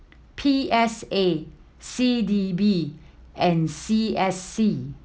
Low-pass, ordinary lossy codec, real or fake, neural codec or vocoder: none; none; real; none